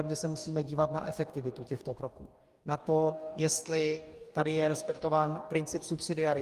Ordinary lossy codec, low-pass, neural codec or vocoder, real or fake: Opus, 32 kbps; 14.4 kHz; codec, 44.1 kHz, 2.6 kbps, DAC; fake